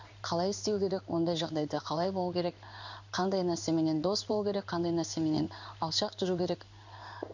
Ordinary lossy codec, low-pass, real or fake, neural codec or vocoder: none; 7.2 kHz; fake; codec, 16 kHz in and 24 kHz out, 1 kbps, XY-Tokenizer